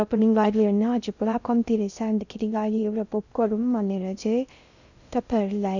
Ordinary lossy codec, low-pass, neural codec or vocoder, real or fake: none; 7.2 kHz; codec, 16 kHz in and 24 kHz out, 0.6 kbps, FocalCodec, streaming, 2048 codes; fake